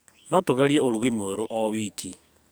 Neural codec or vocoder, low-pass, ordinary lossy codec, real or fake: codec, 44.1 kHz, 2.6 kbps, SNAC; none; none; fake